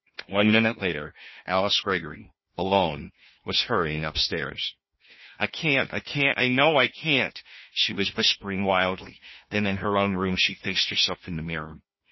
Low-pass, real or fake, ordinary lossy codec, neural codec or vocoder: 7.2 kHz; fake; MP3, 24 kbps; codec, 16 kHz, 1 kbps, FunCodec, trained on Chinese and English, 50 frames a second